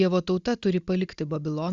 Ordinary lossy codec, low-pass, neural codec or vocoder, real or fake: Opus, 64 kbps; 7.2 kHz; none; real